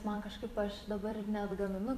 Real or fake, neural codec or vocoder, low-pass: fake; vocoder, 44.1 kHz, 128 mel bands every 256 samples, BigVGAN v2; 14.4 kHz